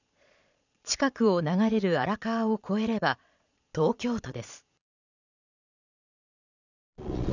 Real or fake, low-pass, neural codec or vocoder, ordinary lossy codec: fake; 7.2 kHz; vocoder, 22.05 kHz, 80 mel bands, Vocos; none